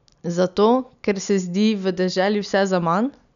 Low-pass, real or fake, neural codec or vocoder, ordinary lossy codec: 7.2 kHz; real; none; none